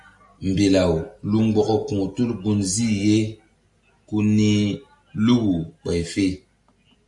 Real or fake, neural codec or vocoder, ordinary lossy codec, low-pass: real; none; AAC, 64 kbps; 10.8 kHz